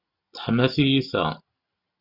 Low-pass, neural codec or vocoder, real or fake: 5.4 kHz; none; real